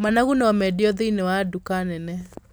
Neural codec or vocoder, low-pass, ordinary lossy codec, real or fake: none; none; none; real